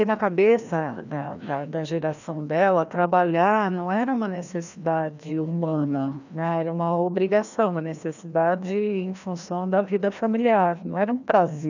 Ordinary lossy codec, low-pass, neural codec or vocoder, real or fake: none; 7.2 kHz; codec, 16 kHz, 1 kbps, FreqCodec, larger model; fake